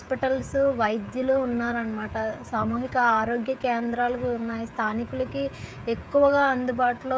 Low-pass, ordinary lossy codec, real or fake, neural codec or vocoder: none; none; fake; codec, 16 kHz, 16 kbps, FreqCodec, smaller model